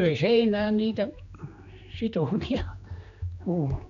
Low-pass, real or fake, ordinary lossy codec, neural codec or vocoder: 7.2 kHz; fake; none; codec, 16 kHz, 2 kbps, X-Codec, HuBERT features, trained on general audio